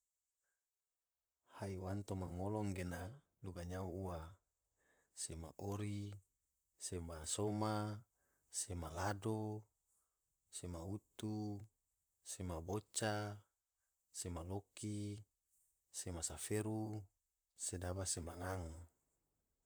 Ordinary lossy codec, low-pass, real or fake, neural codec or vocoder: none; none; fake; vocoder, 44.1 kHz, 128 mel bands, Pupu-Vocoder